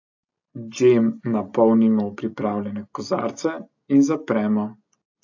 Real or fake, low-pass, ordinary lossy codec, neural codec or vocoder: real; 7.2 kHz; AAC, 48 kbps; none